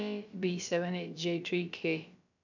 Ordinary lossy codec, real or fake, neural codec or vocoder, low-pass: none; fake; codec, 16 kHz, about 1 kbps, DyCAST, with the encoder's durations; 7.2 kHz